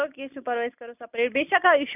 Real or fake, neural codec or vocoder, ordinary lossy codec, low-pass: real; none; none; 3.6 kHz